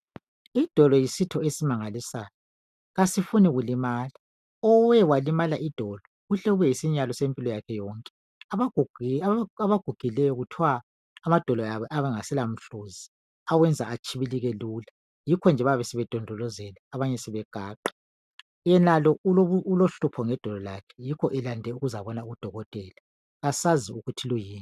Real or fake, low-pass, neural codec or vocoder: real; 14.4 kHz; none